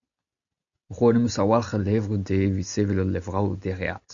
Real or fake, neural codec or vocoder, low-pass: real; none; 7.2 kHz